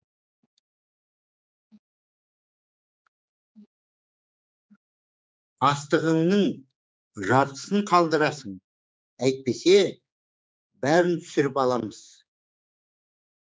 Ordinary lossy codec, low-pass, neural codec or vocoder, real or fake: none; none; codec, 16 kHz, 4 kbps, X-Codec, HuBERT features, trained on general audio; fake